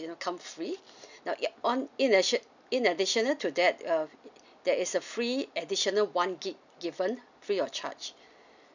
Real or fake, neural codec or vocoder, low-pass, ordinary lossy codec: real; none; 7.2 kHz; none